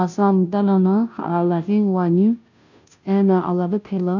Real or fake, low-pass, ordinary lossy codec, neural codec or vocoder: fake; 7.2 kHz; none; codec, 16 kHz, 0.5 kbps, FunCodec, trained on Chinese and English, 25 frames a second